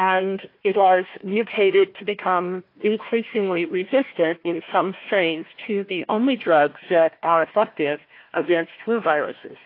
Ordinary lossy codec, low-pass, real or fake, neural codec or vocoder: AAC, 32 kbps; 5.4 kHz; fake; codec, 16 kHz, 1 kbps, FunCodec, trained on Chinese and English, 50 frames a second